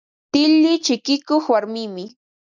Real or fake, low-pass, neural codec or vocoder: real; 7.2 kHz; none